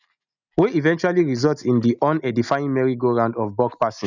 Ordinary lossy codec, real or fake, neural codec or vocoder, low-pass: none; real; none; 7.2 kHz